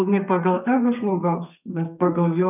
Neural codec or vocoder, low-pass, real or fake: codec, 32 kHz, 1.9 kbps, SNAC; 3.6 kHz; fake